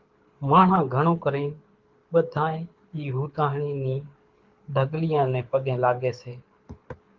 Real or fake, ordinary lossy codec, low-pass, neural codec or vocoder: fake; Opus, 32 kbps; 7.2 kHz; codec, 24 kHz, 6 kbps, HILCodec